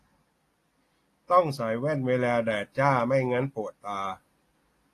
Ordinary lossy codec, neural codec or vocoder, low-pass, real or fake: AAC, 48 kbps; none; 14.4 kHz; real